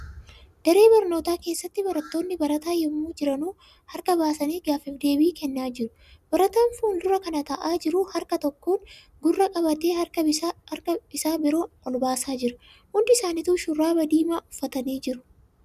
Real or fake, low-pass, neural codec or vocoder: real; 14.4 kHz; none